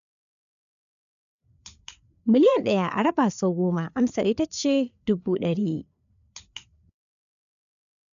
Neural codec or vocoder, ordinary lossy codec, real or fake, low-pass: codec, 16 kHz, 4 kbps, FreqCodec, larger model; none; fake; 7.2 kHz